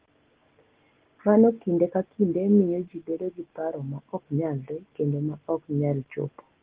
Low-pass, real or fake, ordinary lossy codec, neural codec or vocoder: 3.6 kHz; real; Opus, 16 kbps; none